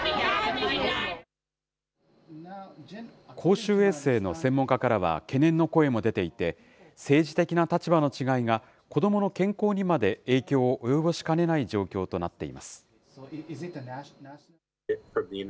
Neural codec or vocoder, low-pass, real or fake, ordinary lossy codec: none; none; real; none